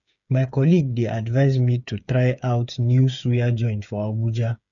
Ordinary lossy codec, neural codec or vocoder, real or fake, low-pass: none; codec, 16 kHz, 8 kbps, FreqCodec, smaller model; fake; 7.2 kHz